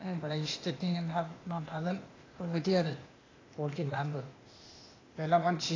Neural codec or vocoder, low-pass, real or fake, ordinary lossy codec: codec, 16 kHz, 0.8 kbps, ZipCodec; 7.2 kHz; fake; AAC, 32 kbps